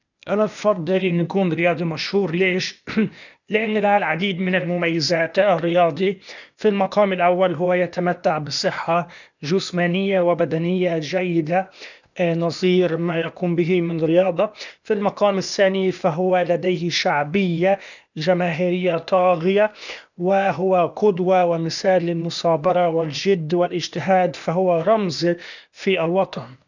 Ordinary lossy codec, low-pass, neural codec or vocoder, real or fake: none; 7.2 kHz; codec, 16 kHz, 0.8 kbps, ZipCodec; fake